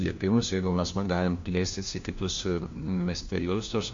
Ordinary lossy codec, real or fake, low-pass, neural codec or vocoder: MP3, 48 kbps; fake; 7.2 kHz; codec, 16 kHz, 1 kbps, FunCodec, trained on LibriTTS, 50 frames a second